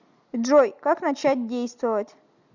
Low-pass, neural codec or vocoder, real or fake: 7.2 kHz; none; real